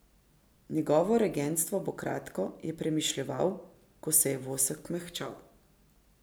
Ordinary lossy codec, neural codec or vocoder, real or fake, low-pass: none; none; real; none